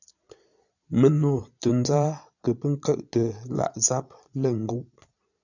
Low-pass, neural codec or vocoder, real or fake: 7.2 kHz; vocoder, 22.05 kHz, 80 mel bands, Vocos; fake